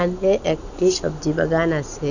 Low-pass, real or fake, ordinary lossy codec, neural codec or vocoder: 7.2 kHz; real; none; none